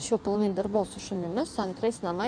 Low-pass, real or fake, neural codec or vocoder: 9.9 kHz; fake; codec, 16 kHz in and 24 kHz out, 1.1 kbps, FireRedTTS-2 codec